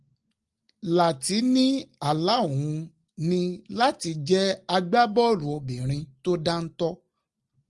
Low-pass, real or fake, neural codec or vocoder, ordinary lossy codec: 10.8 kHz; real; none; Opus, 24 kbps